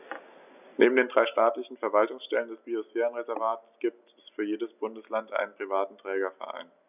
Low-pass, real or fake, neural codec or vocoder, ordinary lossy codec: 3.6 kHz; real; none; none